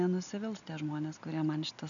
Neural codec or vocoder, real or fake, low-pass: none; real; 7.2 kHz